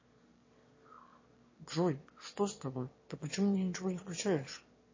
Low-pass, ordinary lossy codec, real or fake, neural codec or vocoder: 7.2 kHz; MP3, 32 kbps; fake; autoencoder, 22.05 kHz, a latent of 192 numbers a frame, VITS, trained on one speaker